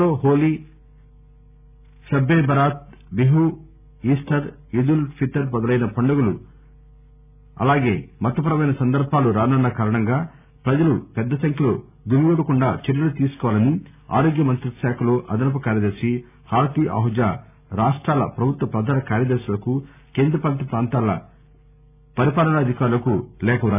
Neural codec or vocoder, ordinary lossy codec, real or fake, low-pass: none; none; real; 3.6 kHz